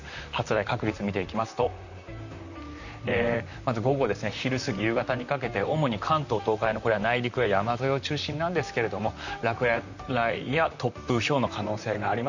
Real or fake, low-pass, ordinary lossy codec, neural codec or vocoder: fake; 7.2 kHz; none; vocoder, 44.1 kHz, 128 mel bands, Pupu-Vocoder